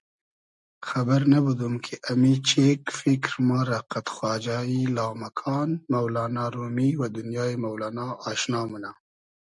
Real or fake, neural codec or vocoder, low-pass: real; none; 10.8 kHz